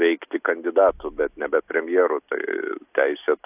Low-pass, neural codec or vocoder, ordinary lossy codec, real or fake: 3.6 kHz; none; AAC, 32 kbps; real